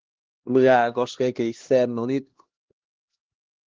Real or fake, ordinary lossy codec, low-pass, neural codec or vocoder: fake; Opus, 16 kbps; 7.2 kHz; codec, 16 kHz, 1 kbps, X-Codec, HuBERT features, trained on LibriSpeech